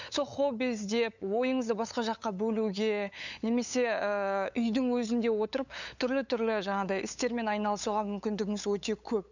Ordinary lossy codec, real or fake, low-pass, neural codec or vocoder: none; fake; 7.2 kHz; codec, 16 kHz, 8 kbps, FunCodec, trained on LibriTTS, 25 frames a second